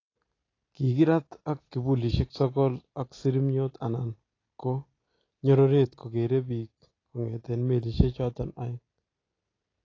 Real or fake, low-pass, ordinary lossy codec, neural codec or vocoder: real; 7.2 kHz; AAC, 32 kbps; none